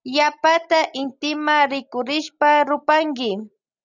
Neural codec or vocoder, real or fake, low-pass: none; real; 7.2 kHz